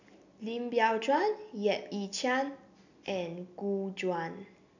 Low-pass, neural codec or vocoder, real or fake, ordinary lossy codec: 7.2 kHz; none; real; none